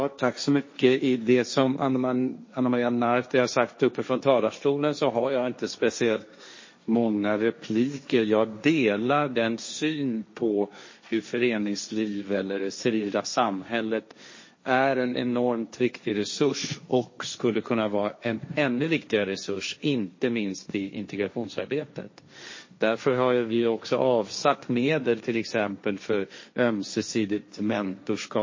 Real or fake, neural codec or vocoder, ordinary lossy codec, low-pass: fake; codec, 16 kHz, 1.1 kbps, Voila-Tokenizer; MP3, 32 kbps; 7.2 kHz